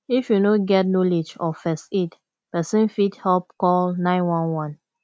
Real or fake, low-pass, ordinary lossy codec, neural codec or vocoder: real; none; none; none